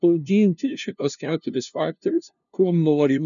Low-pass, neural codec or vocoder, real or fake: 7.2 kHz; codec, 16 kHz, 0.5 kbps, FunCodec, trained on LibriTTS, 25 frames a second; fake